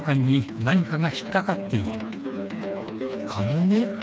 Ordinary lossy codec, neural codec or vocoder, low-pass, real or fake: none; codec, 16 kHz, 2 kbps, FreqCodec, smaller model; none; fake